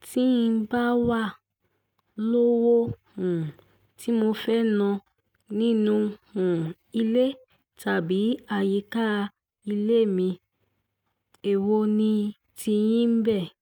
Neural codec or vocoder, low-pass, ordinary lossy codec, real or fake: none; 19.8 kHz; none; real